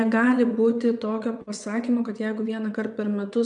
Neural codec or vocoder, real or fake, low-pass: vocoder, 22.05 kHz, 80 mel bands, WaveNeXt; fake; 9.9 kHz